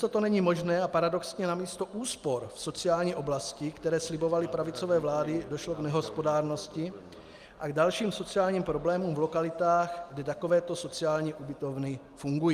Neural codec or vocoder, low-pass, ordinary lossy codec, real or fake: none; 14.4 kHz; Opus, 32 kbps; real